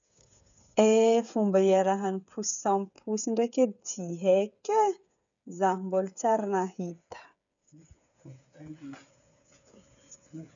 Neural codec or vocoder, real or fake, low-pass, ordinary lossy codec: codec, 16 kHz, 8 kbps, FreqCodec, smaller model; fake; 7.2 kHz; none